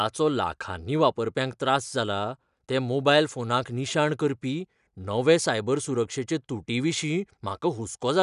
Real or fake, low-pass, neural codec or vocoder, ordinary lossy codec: real; 10.8 kHz; none; none